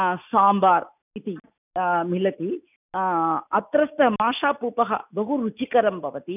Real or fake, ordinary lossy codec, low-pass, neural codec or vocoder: real; none; 3.6 kHz; none